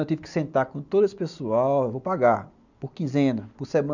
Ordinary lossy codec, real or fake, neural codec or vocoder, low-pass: none; real; none; 7.2 kHz